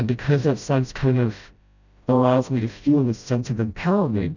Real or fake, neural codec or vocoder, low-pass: fake; codec, 16 kHz, 0.5 kbps, FreqCodec, smaller model; 7.2 kHz